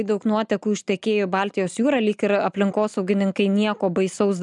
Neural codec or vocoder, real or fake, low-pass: none; real; 10.8 kHz